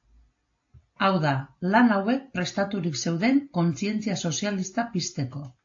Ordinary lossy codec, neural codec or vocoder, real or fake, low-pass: AAC, 48 kbps; none; real; 7.2 kHz